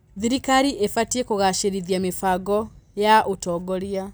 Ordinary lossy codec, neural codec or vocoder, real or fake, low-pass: none; none; real; none